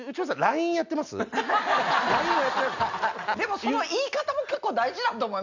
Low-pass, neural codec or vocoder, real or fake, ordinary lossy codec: 7.2 kHz; none; real; none